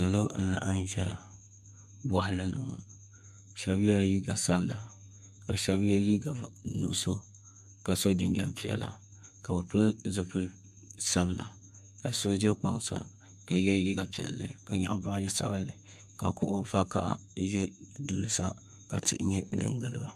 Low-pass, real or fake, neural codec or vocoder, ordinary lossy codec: 14.4 kHz; fake; codec, 32 kHz, 1.9 kbps, SNAC; AAC, 96 kbps